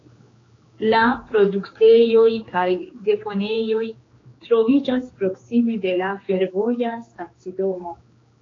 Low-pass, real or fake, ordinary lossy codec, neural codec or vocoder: 7.2 kHz; fake; AAC, 32 kbps; codec, 16 kHz, 2 kbps, X-Codec, HuBERT features, trained on general audio